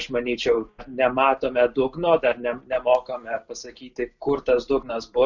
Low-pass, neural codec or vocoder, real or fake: 7.2 kHz; none; real